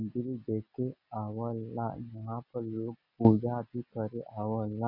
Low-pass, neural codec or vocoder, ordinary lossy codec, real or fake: 5.4 kHz; none; none; real